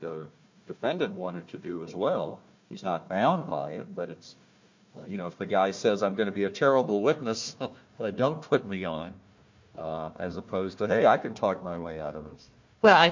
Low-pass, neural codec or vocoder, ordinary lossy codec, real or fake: 7.2 kHz; codec, 16 kHz, 1 kbps, FunCodec, trained on Chinese and English, 50 frames a second; MP3, 48 kbps; fake